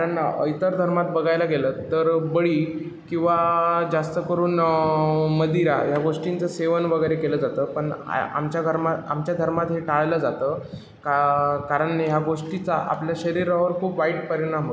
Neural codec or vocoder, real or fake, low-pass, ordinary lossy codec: none; real; none; none